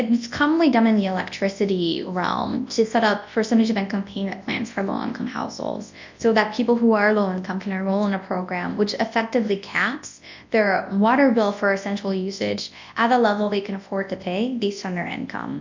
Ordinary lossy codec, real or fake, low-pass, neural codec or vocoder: MP3, 64 kbps; fake; 7.2 kHz; codec, 24 kHz, 0.9 kbps, WavTokenizer, large speech release